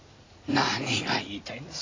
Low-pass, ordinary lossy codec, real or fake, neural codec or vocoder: 7.2 kHz; AAC, 32 kbps; real; none